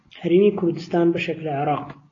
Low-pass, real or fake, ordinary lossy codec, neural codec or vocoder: 7.2 kHz; real; AAC, 32 kbps; none